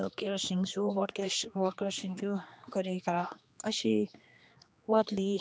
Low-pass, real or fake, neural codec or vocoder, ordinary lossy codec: none; fake; codec, 16 kHz, 2 kbps, X-Codec, HuBERT features, trained on general audio; none